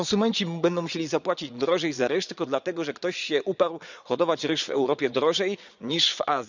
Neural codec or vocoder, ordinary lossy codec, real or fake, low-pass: vocoder, 22.05 kHz, 80 mel bands, Vocos; none; fake; 7.2 kHz